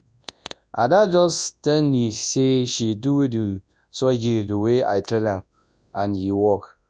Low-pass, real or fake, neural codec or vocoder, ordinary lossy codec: 9.9 kHz; fake; codec, 24 kHz, 0.9 kbps, WavTokenizer, large speech release; none